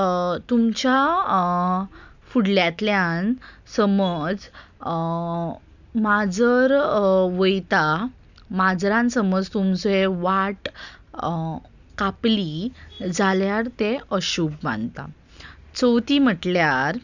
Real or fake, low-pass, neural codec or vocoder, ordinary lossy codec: real; 7.2 kHz; none; none